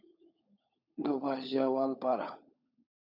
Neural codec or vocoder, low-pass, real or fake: codec, 16 kHz, 16 kbps, FunCodec, trained on LibriTTS, 50 frames a second; 5.4 kHz; fake